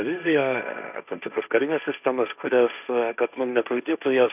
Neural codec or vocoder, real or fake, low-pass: codec, 16 kHz, 1.1 kbps, Voila-Tokenizer; fake; 3.6 kHz